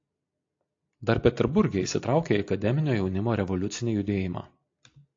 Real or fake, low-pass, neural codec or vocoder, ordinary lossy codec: real; 7.2 kHz; none; AAC, 48 kbps